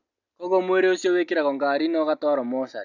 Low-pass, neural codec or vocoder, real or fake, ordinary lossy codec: 7.2 kHz; none; real; none